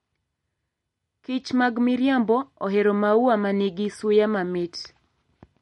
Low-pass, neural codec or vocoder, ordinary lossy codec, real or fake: 19.8 kHz; none; MP3, 48 kbps; real